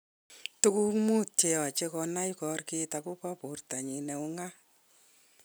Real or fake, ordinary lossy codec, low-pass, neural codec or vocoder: real; none; none; none